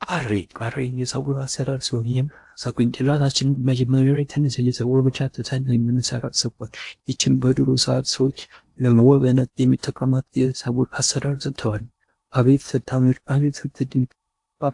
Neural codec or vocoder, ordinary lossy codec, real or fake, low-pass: codec, 16 kHz in and 24 kHz out, 0.8 kbps, FocalCodec, streaming, 65536 codes; AAC, 64 kbps; fake; 10.8 kHz